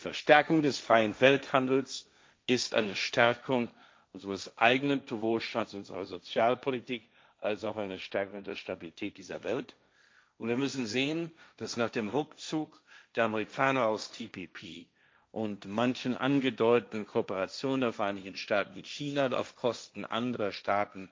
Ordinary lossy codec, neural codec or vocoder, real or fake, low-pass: none; codec, 16 kHz, 1.1 kbps, Voila-Tokenizer; fake; none